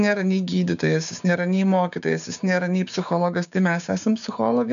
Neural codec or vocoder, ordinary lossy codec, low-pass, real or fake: codec, 16 kHz, 6 kbps, DAC; AAC, 96 kbps; 7.2 kHz; fake